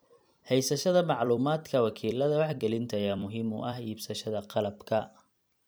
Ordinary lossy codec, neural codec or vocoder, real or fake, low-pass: none; vocoder, 44.1 kHz, 128 mel bands every 256 samples, BigVGAN v2; fake; none